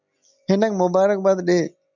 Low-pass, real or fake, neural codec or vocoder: 7.2 kHz; real; none